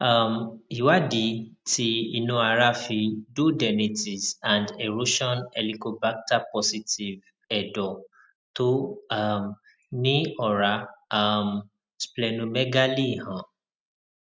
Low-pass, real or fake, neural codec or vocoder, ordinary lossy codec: none; real; none; none